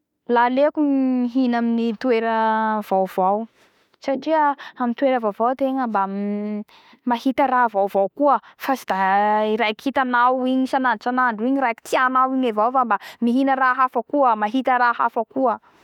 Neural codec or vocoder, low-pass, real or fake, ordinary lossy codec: autoencoder, 48 kHz, 32 numbers a frame, DAC-VAE, trained on Japanese speech; 19.8 kHz; fake; none